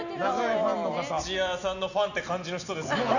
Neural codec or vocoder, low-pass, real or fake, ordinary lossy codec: none; 7.2 kHz; real; none